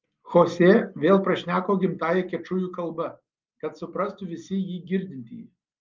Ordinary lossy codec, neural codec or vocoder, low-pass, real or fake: Opus, 24 kbps; none; 7.2 kHz; real